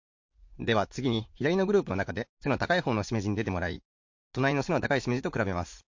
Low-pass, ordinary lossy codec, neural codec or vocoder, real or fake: 7.2 kHz; MP3, 48 kbps; vocoder, 44.1 kHz, 80 mel bands, Vocos; fake